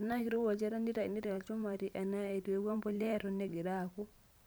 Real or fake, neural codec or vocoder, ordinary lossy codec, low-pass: fake; vocoder, 44.1 kHz, 128 mel bands every 512 samples, BigVGAN v2; none; none